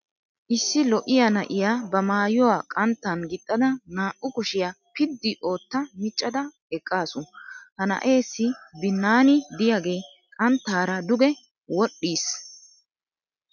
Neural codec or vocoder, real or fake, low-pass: none; real; 7.2 kHz